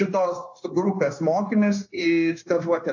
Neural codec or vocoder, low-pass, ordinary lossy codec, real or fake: codec, 16 kHz, 0.9 kbps, LongCat-Audio-Codec; 7.2 kHz; AAC, 48 kbps; fake